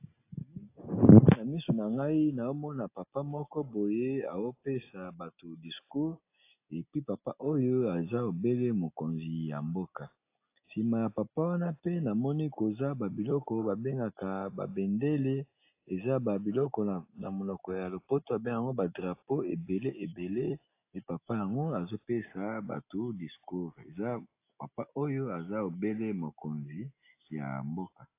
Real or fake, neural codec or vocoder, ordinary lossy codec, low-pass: real; none; AAC, 24 kbps; 3.6 kHz